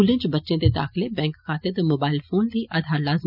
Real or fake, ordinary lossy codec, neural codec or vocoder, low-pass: real; none; none; 5.4 kHz